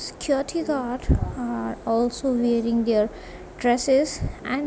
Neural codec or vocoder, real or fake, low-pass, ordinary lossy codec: none; real; none; none